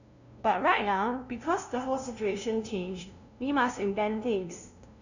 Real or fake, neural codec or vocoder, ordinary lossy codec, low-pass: fake; codec, 16 kHz, 0.5 kbps, FunCodec, trained on LibriTTS, 25 frames a second; none; 7.2 kHz